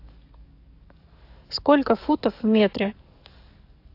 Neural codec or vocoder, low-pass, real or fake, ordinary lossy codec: codec, 44.1 kHz, 7.8 kbps, DAC; 5.4 kHz; fake; AAC, 32 kbps